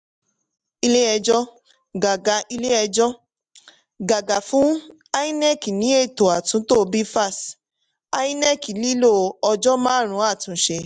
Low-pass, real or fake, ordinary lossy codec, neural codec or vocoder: 9.9 kHz; real; MP3, 96 kbps; none